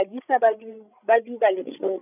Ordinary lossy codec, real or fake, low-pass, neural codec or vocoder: none; fake; 3.6 kHz; codec, 16 kHz, 16 kbps, FreqCodec, larger model